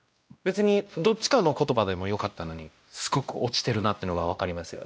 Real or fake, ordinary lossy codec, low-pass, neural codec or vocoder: fake; none; none; codec, 16 kHz, 1 kbps, X-Codec, WavLM features, trained on Multilingual LibriSpeech